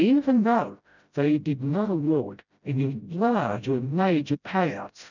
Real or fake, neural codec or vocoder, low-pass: fake; codec, 16 kHz, 0.5 kbps, FreqCodec, smaller model; 7.2 kHz